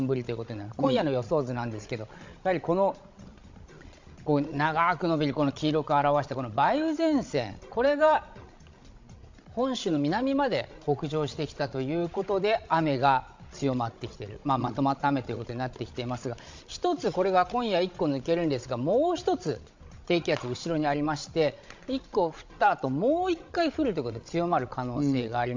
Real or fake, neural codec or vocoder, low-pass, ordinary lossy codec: fake; codec, 16 kHz, 16 kbps, FreqCodec, larger model; 7.2 kHz; MP3, 64 kbps